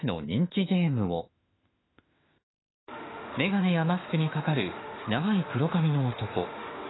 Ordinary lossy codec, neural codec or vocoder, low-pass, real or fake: AAC, 16 kbps; autoencoder, 48 kHz, 32 numbers a frame, DAC-VAE, trained on Japanese speech; 7.2 kHz; fake